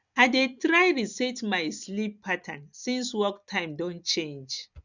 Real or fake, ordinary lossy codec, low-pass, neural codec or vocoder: real; none; 7.2 kHz; none